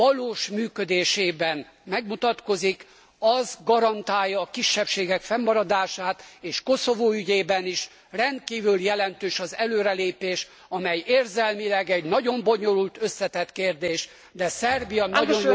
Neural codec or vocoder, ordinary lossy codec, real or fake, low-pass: none; none; real; none